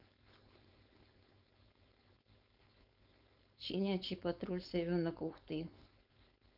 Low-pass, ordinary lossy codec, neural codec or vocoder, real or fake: 5.4 kHz; none; codec, 16 kHz, 4.8 kbps, FACodec; fake